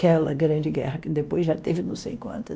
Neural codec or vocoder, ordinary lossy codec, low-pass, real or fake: codec, 16 kHz, 1 kbps, X-Codec, WavLM features, trained on Multilingual LibriSpeech; none; none; fake